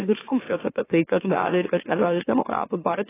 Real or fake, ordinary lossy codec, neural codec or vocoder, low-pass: fake; AAC, 16 kbps; autoencoder, 44.1 kHz, a latent of 192 numbers a frame, MeloTTS; 3.6 kHz